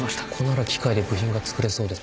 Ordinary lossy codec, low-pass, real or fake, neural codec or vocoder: none; none; real; none